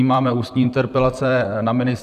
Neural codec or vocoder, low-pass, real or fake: vocoder, 44.1 kHz, 128 mel bands, Pupu-Vocoder; 14.4 kHz; fake